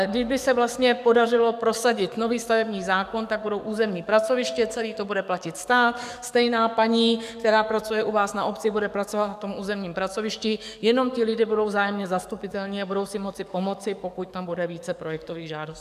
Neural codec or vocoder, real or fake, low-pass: codec, 44.1 kHz, 7.8 kbps, DAC; fake; 14.4 kHz